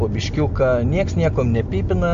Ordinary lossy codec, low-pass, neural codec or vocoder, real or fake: MP3, 48 kbps; 7.2 kHz; none; real